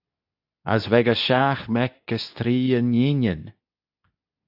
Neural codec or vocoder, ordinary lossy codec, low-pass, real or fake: codec, 24 kHz, 0.9 kbps, WavTokenizer, medium speech release version 2; AAC, 48 kbps; 5.4 kHz; fake